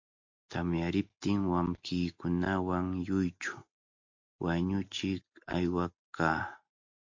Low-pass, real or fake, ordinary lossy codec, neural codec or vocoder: 7.2 kHz; real; MP3, 48 kbps; none